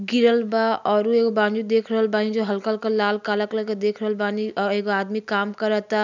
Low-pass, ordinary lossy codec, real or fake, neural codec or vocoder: 7.2 kHz; none; real; none